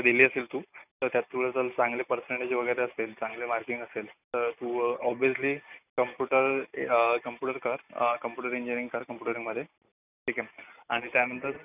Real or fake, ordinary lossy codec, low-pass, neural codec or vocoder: real; none; 3.6 kHz; none